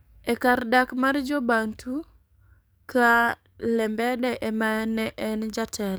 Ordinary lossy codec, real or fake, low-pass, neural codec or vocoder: none; fake; none; codec, 44.1 kHz, 7.8 kbps, DAC